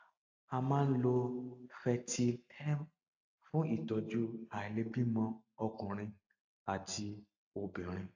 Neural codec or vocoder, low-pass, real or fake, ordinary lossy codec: none; 7.2 kHz; real; AAC, 48 kbps